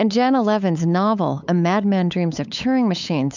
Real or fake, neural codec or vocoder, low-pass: fake; codec, 16 kHz, 8 kbps, FunCodec, trained on LibriTTS, 25 frames a second; 7.2 kHz